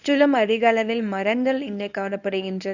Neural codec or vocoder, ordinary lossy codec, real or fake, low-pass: codec, 24 kHz, 0.9 kbps, WavTokenizer, medium speech release version 1; none; fake; 7.2 kHz